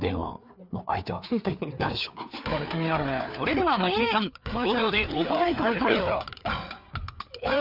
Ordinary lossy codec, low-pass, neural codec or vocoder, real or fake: none; 5.4 kHz; codec, 16 kHz, 4 kbps, FreqCodec, larger model; fake